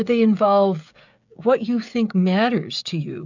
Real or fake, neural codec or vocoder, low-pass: fake; codec, 16 kHz, 16 kbps, FreqCodec, smaller model; 7.2 kHz